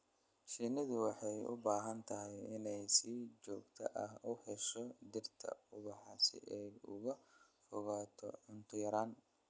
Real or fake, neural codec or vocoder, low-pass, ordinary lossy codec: real; none; none; none